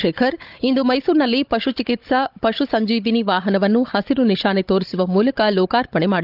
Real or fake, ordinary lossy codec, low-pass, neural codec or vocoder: fake; Opus, 32 kbps; 5.4 kHz; codec, 16 kHz, 16 kbps, FunCodec, trained on Chinese and English, 50 frames a second